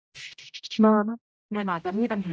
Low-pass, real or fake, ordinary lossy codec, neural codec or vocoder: none; fake; none; codec, 16 kHz, 0.5 kbps, X-Codec, HuBERT features, trained on general audio